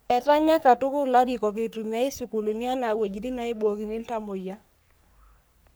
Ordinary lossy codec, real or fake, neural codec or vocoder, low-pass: none; fake; codec, 44.1 kHz, 3.4 kbps, Pupu-Codec; none